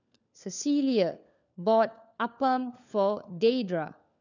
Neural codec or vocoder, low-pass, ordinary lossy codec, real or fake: codec, 16 kHz, 4 kbps, FunCodec, trained on LibriTTS, 50 frames a second; 7.2 kHz; none; fake